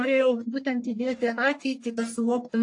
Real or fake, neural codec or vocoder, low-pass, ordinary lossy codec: fake; codec, 44.1 kHz, 1.7 kbps, Pupu-Codec; 10.8 kHz; AAC, 48 kbps